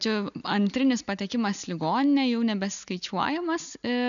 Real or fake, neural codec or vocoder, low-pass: real; none; 7.2 kHz